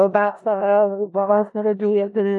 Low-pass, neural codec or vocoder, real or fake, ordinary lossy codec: 10.8 kHz; codec, 16 kHz in and 24 kHz out, 0.4 kbps, LongCat-Audio-Codec, four codebook decoder; fake; AAC, 64 kbps